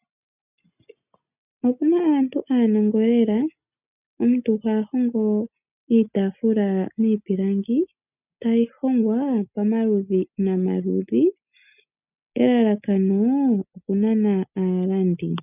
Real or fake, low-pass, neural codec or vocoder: real; 3.6 kHz; none